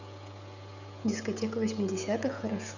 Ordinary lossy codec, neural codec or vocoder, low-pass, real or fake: none; codec, 16 kHz, 16 kbps, FreqCodec, smaller model; 7.2 kHz; fake